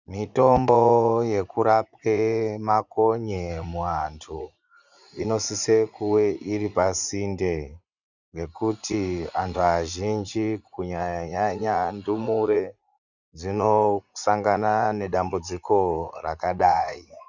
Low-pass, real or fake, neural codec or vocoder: 7.2 kHz; fake; vocoder, 44.1 kHz, 80 mel bands, Vocos